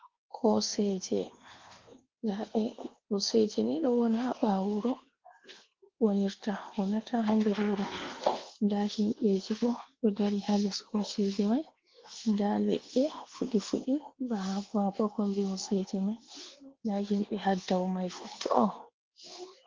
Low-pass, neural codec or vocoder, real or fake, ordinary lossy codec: 7.2 kHz; codec, 24 kHz, 1.2 kbps, DualCodec; fake; Opus, 16 kbps